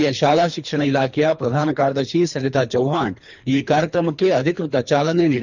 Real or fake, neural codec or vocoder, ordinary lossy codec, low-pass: fake; codec, 24 kHz, 3 kbps, HILCodec; none; 7.2 kHz